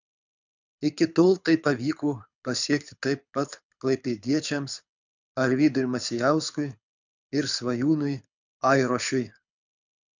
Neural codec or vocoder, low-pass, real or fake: codec, 24 kHz, 6 kbps, HILCodec; 7.2 kHz; fake